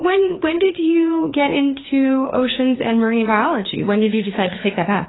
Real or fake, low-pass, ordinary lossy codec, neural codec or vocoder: fake; 7.2 kHz; AAC, 16 kbps; codec, 16 kHz, 2 kbps, FreqCodec, larger model